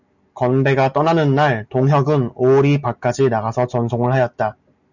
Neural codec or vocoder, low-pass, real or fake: none; 7.2 kHz; real